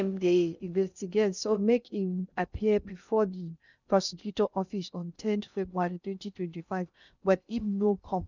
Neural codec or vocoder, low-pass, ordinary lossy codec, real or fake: codec, 16 kHz in and 24 kHz out, 0.6 kbps, FocalCodec, streaming, 2048 codes; 7.2 kHz; none; fake